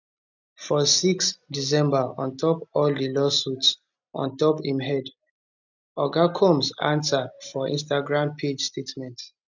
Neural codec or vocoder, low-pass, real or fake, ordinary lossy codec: none; 7.2 kHz; real; none